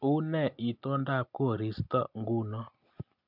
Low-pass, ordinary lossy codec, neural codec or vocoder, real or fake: 5.4 kHz; MP3, 48 kbps; none; real